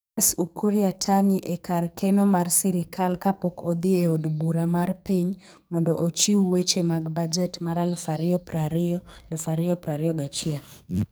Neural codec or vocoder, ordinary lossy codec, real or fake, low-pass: codec, 44.1 kHz, 2.6 kbps, SNAC; none; fake; none